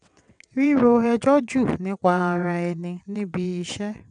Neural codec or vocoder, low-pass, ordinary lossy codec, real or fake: vocoder, 22.05 kHz, 80 mel bands, WaveNeXt; 9.9 kHz; none; fake